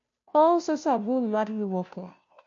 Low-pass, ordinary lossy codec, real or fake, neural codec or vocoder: 7.2 kHz; MP3, 48 kbps; fake; codec, 16 kHz, 0.5 kbps, FunCodec, trained on Chinese and English, 25 frames a second